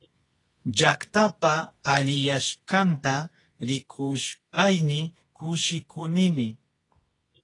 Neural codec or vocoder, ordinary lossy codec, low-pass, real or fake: codec, 24 kHz, 0.9 kbps, WavTokenizer, medium music audio release; AAC, 32 kbps; 10.8 kHz; fake